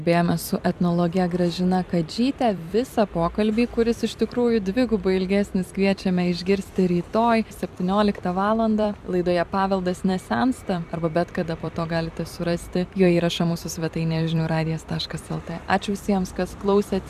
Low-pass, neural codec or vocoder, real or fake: 14.4 kHz; vocoder, 44.1 kHz, 128 mel bands every 256 samples, BigVGAN v2; fake